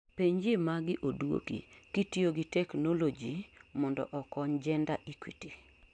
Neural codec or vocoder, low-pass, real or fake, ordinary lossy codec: none; 9.9 kHz; real; MP3, 96 kbps